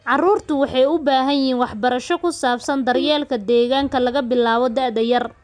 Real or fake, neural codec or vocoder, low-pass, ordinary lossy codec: real; none; 9.9 kHz; none